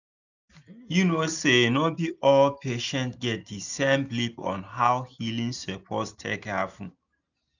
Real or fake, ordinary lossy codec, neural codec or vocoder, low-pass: real; none; none; 7.2 kHz